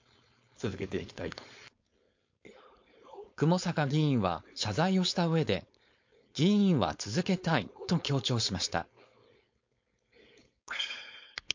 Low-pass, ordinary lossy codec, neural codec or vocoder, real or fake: 7.2 kHz; MP3, 48 kbps; codec, 16 kHz, 4.8 kbps, FACodec; fake